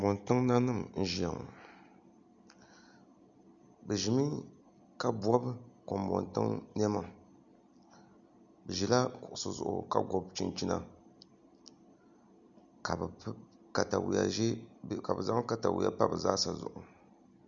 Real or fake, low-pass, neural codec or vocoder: real; 7.2 kHz; none